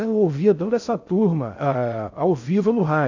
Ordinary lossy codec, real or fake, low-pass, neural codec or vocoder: AAC, 48 kbps; fake; 7.2 kHz; codec, 16 kHz in and 24 kHz out, 0.6 kbps, FocalCodec, streaming, 2048 codes